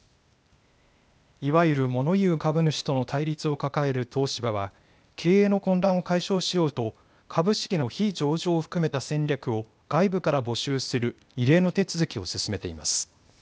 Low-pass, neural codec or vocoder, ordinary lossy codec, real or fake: none; codec, 16 kHz, 0.8 kbps, ZipCodec; none; fake